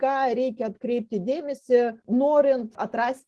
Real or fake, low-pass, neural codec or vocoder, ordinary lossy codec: real; 10.8 kHz; none; Opus, 16 kbps